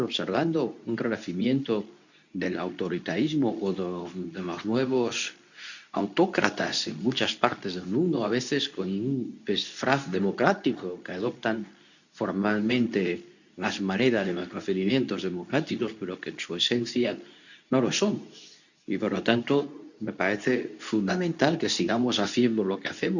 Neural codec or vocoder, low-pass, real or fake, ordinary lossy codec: codec, 24 kHz, 0.9 kbps, WavTokenizer, medium speech release version 2; 7.2 kHz; fake; none